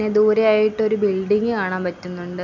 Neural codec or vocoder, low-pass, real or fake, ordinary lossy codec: none; 7.2 kHz; real; none